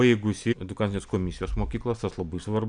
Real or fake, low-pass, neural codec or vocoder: real; 9.9 kHz; none